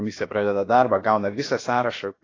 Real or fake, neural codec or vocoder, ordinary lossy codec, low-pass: fake; codec, 16 kHz, about 1 kbps, DyCAST, with the encoder's durations; AAC, 32 kbps; 7.2 kHz